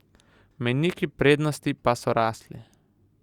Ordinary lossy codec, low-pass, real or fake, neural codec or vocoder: Opus, 64 kbps; 19.8 kHz; real; none